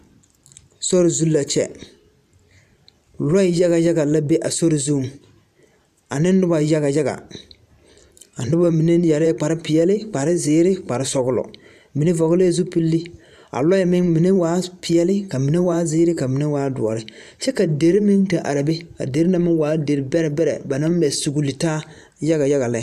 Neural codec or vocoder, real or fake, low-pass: vocoder, 44.1 kHz, 128 mel bands every 512 samples, BigVGAN v2; fake; 14.4 kHz